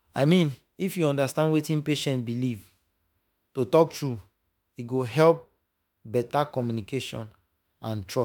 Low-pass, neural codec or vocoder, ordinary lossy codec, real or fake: none; autoencoder, 48 kHz, 32 numbers a frame, DAC-VAE, trained on Japanese speech; none; fake